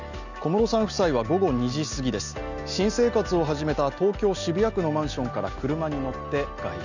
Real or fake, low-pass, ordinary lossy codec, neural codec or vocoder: real; 7.2 kHz; none; none